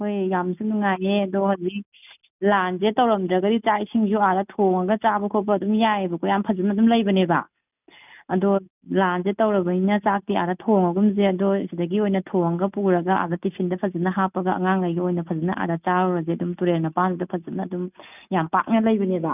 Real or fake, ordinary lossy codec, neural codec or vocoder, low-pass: real; none; none; 3.6 kHz